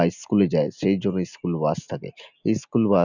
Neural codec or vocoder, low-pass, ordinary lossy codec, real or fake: none; 7.2 kHz; none; real